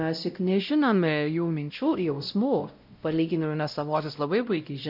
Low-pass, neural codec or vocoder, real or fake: 5.4 kHz; codec, 16 kHz, 0.5 kbps, X-Codec, WavLM features, trained on Multilingual LibriSpeech; fake